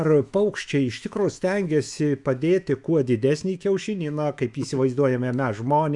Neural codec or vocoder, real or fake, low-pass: autoencoder, 48 kHz, 128 numbers a frame, DAC-VAE, trained on Japanese speech; fake; 10.8 kHz